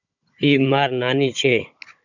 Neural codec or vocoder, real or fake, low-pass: codec, 16 kHz, 16 kbps, FunCodec, trained on Chinese and English, 50 frames a second; fake; 7.2 kHz